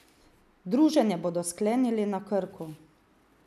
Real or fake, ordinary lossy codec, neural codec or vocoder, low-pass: real; none; none; 14.4 kHz